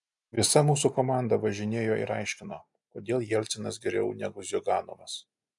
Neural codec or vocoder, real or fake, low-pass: none; real; 10.8 kHz